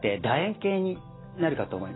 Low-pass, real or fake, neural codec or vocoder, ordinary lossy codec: 7.2 kHz; real; none; AAC, 16 kbps